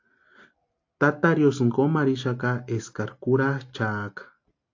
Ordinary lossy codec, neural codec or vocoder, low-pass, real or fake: AAC, 48 kbps; none; 7.2 kHz; real